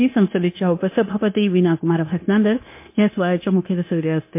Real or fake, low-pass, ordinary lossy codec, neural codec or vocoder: fake; 3.6 kHz; MP3, 24 kbps; codec, 16 kHz, 0.9 kbps, LongCat-Audio-Codec